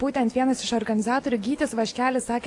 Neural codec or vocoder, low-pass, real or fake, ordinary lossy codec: none; 10.8 kHz; real; AAC, 48 kbps